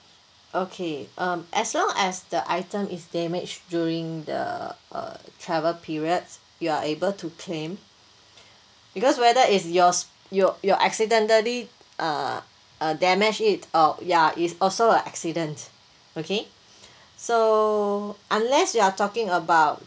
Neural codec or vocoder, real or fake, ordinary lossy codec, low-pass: none; real; none; none